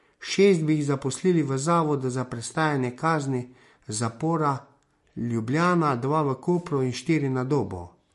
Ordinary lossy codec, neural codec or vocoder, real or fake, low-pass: MP3, 48 kbps; none; real; 14.4 kHz